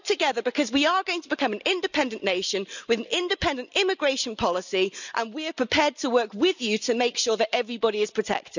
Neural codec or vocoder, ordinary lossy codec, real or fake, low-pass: none; none; real; 7.2 kHz